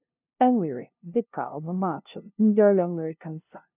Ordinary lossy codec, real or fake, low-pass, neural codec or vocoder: none; fake; 3.6 kHz; codec, 16 kHz, 0.5 kbps, FunCodec, trained on LibriTTS, 25 frames a second